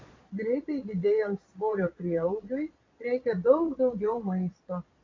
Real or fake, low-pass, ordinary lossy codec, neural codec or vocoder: fake; 7.2 kHz; MP3, 64 kbps; vocoder, 22.05 kHz, 80 mel bands, Vocos